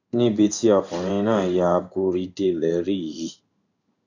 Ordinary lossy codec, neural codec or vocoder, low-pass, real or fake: none; codec, 16 kHz in and 24 kHz out, 1 kbps, XY-Tokenizer; 7.2 kHz; fake